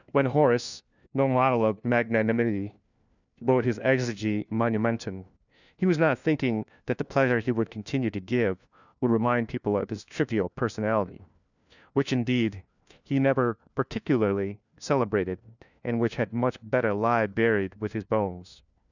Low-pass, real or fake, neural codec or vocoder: 7.2 kHz; fake; codec, 16 kHz, 1 kbps, FunCodec, trained on LibriTTS, 50 frames a second